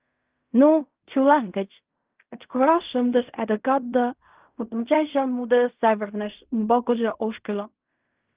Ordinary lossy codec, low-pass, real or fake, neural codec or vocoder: Opus, 24 kbps; 3.6 kHz; fake; codec, 16 kHz in and 24 kHz out, 0.4 kbps, LongCat-Audio-Codec, fine tuned four codebook decoder